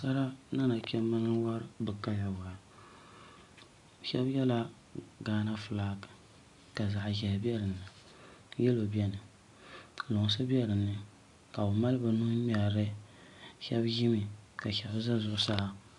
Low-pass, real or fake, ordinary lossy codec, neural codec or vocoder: 10.8 kHz; real; AAC, 64 kbps; none